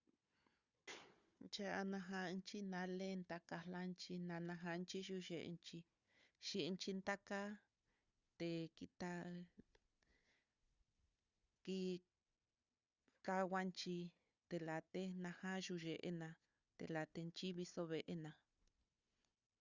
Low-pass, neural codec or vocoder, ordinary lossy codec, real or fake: 7.2 kHz; codec, 16 kHz, 4 kbps, FunCodec, trained on Chinese and English, 50 frames a second; Opus, 64 kbps; fake